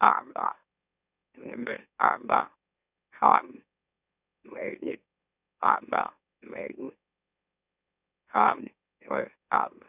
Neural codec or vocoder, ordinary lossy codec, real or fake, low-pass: autoencoder, 44.1 kHz, a latent of 192 numbers a frame, MeloTTS; none; fake; 3.6 kHz